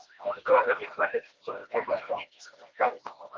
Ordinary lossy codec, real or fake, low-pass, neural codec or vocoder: Opus, 32 kbps; fake; 7.2 kHz; codec, 16 kHz, 1 kbps, FreqCodec, smaller model